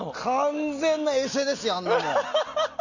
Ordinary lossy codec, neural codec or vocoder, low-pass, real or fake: none; none; 7.2 kHz; real